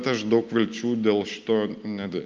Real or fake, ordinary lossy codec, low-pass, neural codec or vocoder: real; Opus, 32 kbps; 7.2 kHz; none